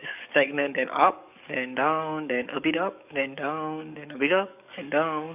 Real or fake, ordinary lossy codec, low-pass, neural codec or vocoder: fake; none; 3.6 kHz; codec, 44.1 kHz, 7.8 kbps, DAC